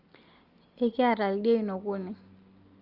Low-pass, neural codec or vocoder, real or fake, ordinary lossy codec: 5.4 kHz; none; real; Opus, 24 kbps